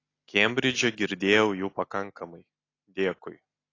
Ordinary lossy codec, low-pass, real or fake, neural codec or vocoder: AAC, 32 kbps; 7.2 kHz; real; none